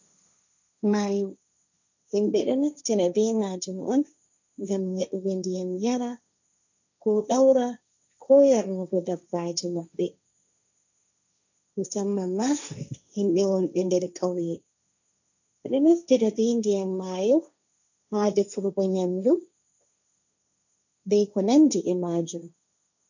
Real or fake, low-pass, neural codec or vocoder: fake; 7.2 kHz; codec, 16 kHz, 1.1 kbps, Voila-Tokenizer